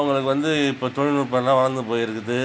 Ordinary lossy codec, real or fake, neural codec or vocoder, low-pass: none; real; none; none